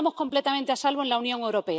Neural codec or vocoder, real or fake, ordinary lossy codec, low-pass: none; real; none; none